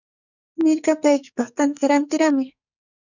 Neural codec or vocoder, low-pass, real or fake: codec, 44.1 kHz, 2.6 kbps, SNAC; 7.2 kHz; fake